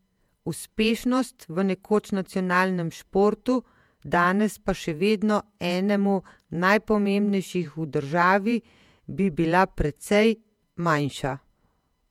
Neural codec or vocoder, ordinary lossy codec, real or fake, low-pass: vocoder, 48 kHz, 128 mel bands, Vocos; MP3, 96 kbps; fake; 19.8 kHz